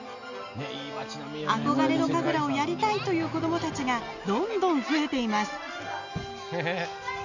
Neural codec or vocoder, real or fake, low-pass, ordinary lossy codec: none; real; 7.2 kHz; none